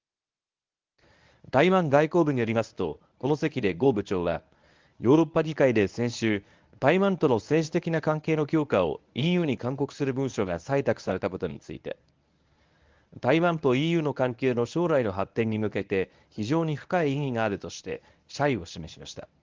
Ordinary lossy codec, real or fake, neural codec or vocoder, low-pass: Opus, 24 kbps; fake; codec, 24 kHz, 0.9 kbps, WavTokenizer, medium speech release version 1; 7.2 kHz